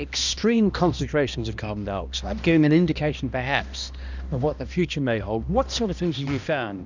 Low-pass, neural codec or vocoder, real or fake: 7.2 kHz; codec, 16 kHz, 1 kbps, X-Codec, HuBERT features, trained on balanced general audio; fake